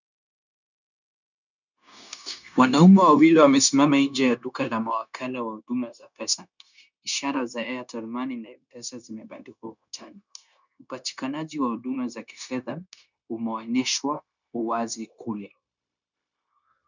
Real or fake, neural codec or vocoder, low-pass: fake; codec, 16 kHz, 0.9 kbps, LongCat-Audio-Codec; 7.2 kHz